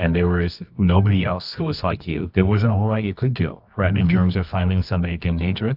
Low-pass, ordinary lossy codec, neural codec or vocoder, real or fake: 5.4 kHz; AAC, 48 kbps; codec, 24 kHz, 0.9 kbps, WavTokenizer, medium music audio release; fake